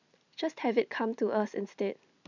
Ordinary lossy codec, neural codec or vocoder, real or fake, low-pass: none; none; real; 7.2 kHz